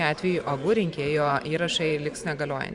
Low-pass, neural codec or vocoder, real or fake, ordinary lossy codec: 10.8 kHz; vocoder, 44.1 kHz, 128 mel bands every 512 samples, BigVGAN v2; fake; Opus, 64 kbps